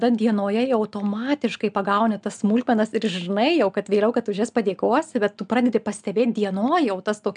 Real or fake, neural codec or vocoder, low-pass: real; none; 9.9 kHz